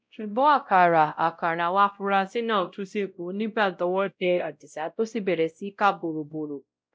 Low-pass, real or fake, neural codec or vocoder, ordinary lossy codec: none; fake; codec, 16 kHz, 0.5 kbps, X-Codec, WavLM features, trained on Multilingual LibriSpeech; none